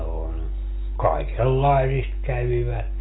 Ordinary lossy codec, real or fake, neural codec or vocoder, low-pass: AAC, 16 kbps; real; none; 7.2 kHz